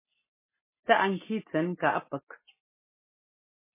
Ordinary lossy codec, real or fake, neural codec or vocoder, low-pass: MP3, 16 kbps; real; none; 3.6 kHz